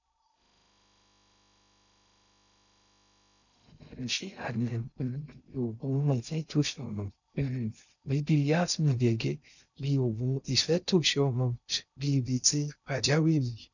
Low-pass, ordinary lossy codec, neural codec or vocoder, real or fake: 7.2 kHz; none; codec, 16 kHz in and 24 kHz out, 0.6 kbps, FocalCodec, streaming, 2048 codes; fake